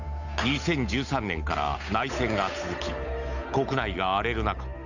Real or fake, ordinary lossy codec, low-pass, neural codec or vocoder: fake; none; 7.2 kHz; codec, 16 kHz, 8 kbps, FunCodec, trained on Chinese and English, 25 frames a second